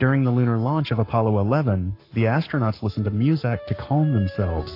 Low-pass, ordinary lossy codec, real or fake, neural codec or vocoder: 5.4 kHz; AAC, 32 kbps; fake; codec, 44.1 kHz, 7.8 kbps, Pupu-Codec